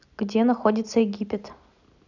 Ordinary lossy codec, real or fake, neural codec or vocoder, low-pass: none; real; none; 7.2 kHz